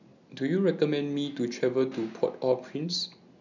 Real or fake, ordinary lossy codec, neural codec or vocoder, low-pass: real; none; none; 7.2 kHz